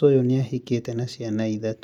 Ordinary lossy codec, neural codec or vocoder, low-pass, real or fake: none; none; 19.8 kHz; real